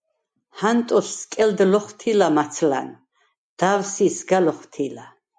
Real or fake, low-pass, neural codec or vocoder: real; 9.9 kHz; none